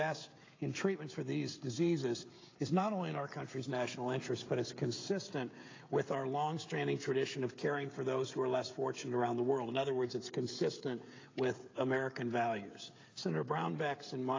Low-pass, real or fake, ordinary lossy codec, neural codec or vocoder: 7.2 kHz; fake; AAC, 32 kbps; codec, 16 kHz, 16 kbps, FreqCodec, smaller model